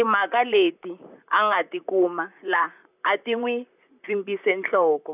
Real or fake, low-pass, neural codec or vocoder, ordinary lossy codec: fake; 3.6 kHz; vocoder, 44.1 kHz, 128 mel bands, Pupu-Vocoder; none